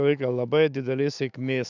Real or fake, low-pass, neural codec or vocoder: fake; 7.2 kHz; autoencoder, 48 kHz, 128 numbers a frame, DAC-VAE, trained on Japanese speech